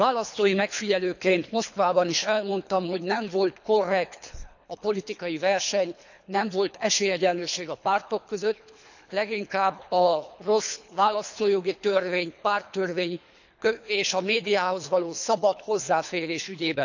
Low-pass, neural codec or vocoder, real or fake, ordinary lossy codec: 7.2 kHz; codec, 24 kHz, 3 kbps, HILCodec; fake; none